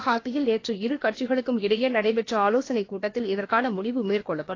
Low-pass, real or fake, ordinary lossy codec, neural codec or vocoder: 7.2 kHz; fake; AAC, 32 kbps; codec, 16 kHz, about 1 kbps, DyCAST, with the encoder's durations